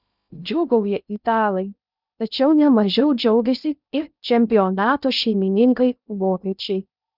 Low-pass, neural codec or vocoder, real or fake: 5.4 kHz; codec, 16 kHz in and 24 kHz out, 0.6 kbps, FocalCodec, streaming, 2048 codes; fake